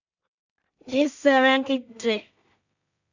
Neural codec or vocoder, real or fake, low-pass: codec, 16 kHz in and 24 kHz out, 0.4 kbps, LongCat-Audio-Codec, two codebook decoder; fake; 7.2 kHz